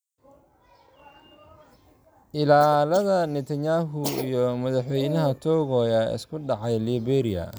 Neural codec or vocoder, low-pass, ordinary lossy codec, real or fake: none; none; none; real